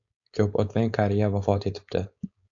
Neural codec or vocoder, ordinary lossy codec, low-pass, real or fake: codec, 16 kHz, 4.8 kbps, FACodec; AAC, 64 kbps; 7.2 kHz; fake